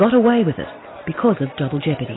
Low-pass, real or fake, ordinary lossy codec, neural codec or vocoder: 7.2 kHz; real; AAC, 16 kbps; none